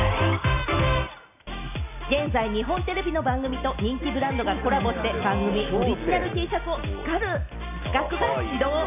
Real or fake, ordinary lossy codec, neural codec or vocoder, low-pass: real; none; none; 3.6 kHz